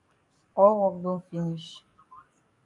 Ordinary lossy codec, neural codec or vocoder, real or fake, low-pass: MP3, 64 kbps; codec, 44.1 kHz, 7.8 kbps, DAC; fake; 10.8 kHz